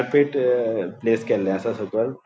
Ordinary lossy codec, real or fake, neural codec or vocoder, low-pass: none; real; none; none